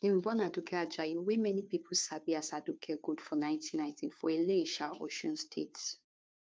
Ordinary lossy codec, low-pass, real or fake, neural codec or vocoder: none; none; fake; codec, 16 kHz, 2 kbps, FunCodec, trained on Chinese and English, 25 frames a second